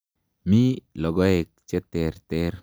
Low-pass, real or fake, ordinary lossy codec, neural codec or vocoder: none; real; none; none